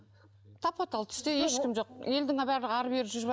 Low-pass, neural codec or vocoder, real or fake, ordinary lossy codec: none; none; real; none